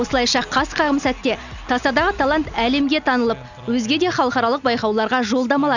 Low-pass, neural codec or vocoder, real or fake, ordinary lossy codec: 7.2 kHz; none; real; none